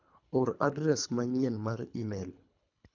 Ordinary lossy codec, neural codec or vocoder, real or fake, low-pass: none; codec, 24 kHz, 3 kbps, HILCodec; fake; 7.2 kHz